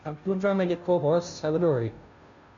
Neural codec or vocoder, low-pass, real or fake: codec, 16 kHz, 0.5 kbps, FunCodec, trained on Chinese and English, 25 frames a second; 7.2 kHz; fake